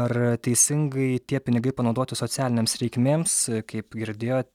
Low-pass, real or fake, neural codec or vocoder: 19.8 kHz; real; none